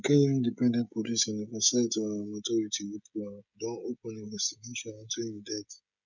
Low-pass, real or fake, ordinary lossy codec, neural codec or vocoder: 7.2 kHz; fake; none; codec, 16 kHz, 16 kbps, FreqCodec, smaller model